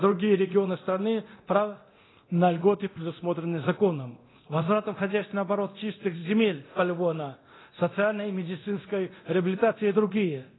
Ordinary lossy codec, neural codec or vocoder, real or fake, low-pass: AAC, 16 kbps; codec, 24 kHz, 0.9 kbps, DualCodec; fake; 7.2 kHz